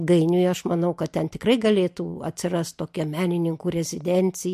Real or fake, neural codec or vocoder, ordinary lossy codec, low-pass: real; none; MP3, 64 kbps; 14.4 kHz